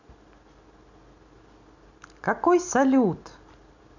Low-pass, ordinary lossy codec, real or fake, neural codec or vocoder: 7.2 kHz; none; real; none